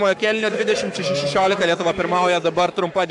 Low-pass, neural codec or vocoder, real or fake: 10.8 kHz; codec, 44.1 kHz, 7.8 kbps, Pupu-Codec; fake